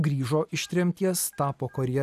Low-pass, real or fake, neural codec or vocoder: 14.4 kHz; real; none